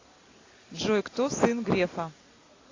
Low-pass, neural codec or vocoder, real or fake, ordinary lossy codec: 7.2 kHz; none; real; AAC, 32 kbps